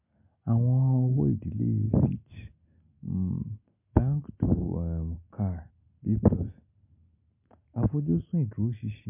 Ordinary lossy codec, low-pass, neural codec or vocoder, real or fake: MP3, 32 kbps; 3.6 kHz; none; real